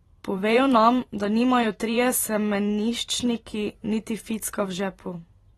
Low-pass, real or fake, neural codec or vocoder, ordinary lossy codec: 19.8 kHz; fake; vocoder, 44.1 kHz, 128 mel bands every 512 samples, BigVGAN v2; AAC, 32 kbps